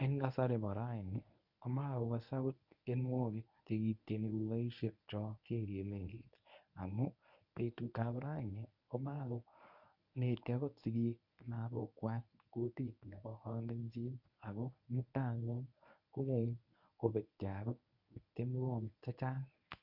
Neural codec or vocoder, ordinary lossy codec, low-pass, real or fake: codec, 24 kHz, 0.9 kbps, WavTokenizer, medium speech release version 1; none; 5.4 kHz; fake